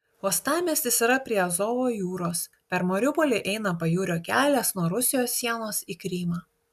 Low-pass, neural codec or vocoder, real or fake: 14.4 kHz; none; real